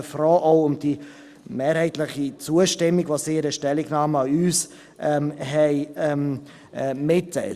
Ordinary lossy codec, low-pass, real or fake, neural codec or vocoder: Opus, 64 kbps; 14.4 kHz; real; none